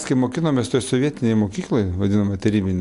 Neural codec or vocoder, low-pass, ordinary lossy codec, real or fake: none; 10.8 kHz; AAC, 64 kbps; real